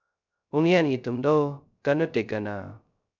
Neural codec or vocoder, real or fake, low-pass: codec, 16 kHz, 0.2 kbps, FocalCodec; fake; 7.2 kHz